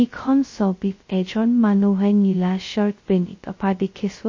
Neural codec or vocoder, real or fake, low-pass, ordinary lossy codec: codec, 16 kHz, 0.2 kbps, FocalCodec; fake; 7.2 kHz; MP3, 32 kbps